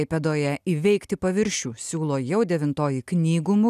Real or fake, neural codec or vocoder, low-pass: real; none; 14.4 kHz